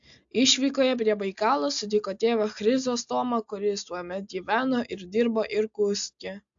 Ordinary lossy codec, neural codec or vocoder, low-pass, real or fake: AAC, 64 kbps; none; 7.2 kHz; real